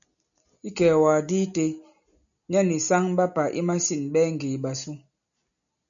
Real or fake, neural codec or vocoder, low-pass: real; none; 7.2 kHz